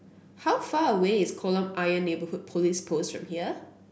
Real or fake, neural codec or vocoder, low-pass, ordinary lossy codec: real; none; none; none